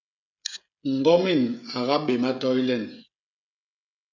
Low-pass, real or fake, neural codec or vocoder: 7.2 kHz; fake; codec, 16 kHz, 16 kbps, FreqCodec, smaller model